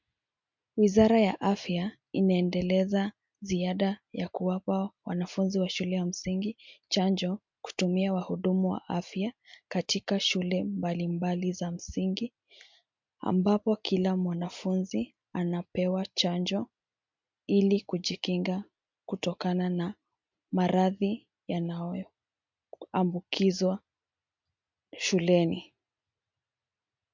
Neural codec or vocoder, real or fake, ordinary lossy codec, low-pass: none; real; MP3, 64 kbps; 7.2 kHz